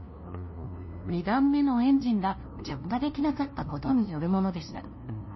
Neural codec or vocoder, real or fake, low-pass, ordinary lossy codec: codec, 16 kHz, 0.5 kbps, FunCodec, trained on LibriTTS, 25 frames a second; fake; 7.2 kHz; MP3, 24 kbps